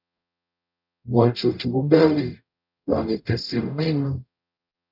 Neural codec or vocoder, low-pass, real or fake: codec, 44.1 kHz, 0.9 kbps, DAC; 5.4 kHz; fake